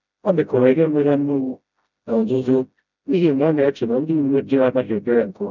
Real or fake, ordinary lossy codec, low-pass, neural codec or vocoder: fake; none; 7.2 kHz; codec, 16 kHz, 0.5 kbps, FreqCodec, smaller model